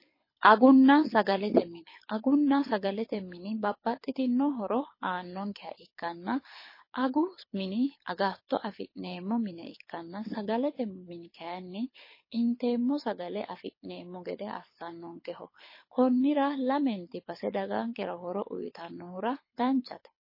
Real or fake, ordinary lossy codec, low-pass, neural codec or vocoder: fake; MP3, 24 kbps; 5.4 kHz; codec, 24 kHz, 6 kbps, HILCodec